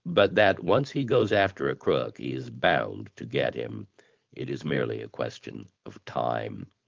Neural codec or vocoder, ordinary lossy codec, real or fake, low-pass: codec, 16 kHz, 4.8 kbps, FACodec; Opus, 32 kbps; fake; 7.2 kHz